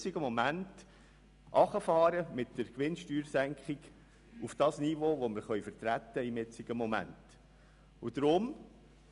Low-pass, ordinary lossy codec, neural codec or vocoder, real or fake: 10.8 kHz; none; none; real